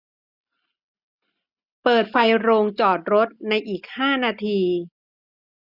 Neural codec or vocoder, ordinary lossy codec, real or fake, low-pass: none; none; real; 5.4 kHz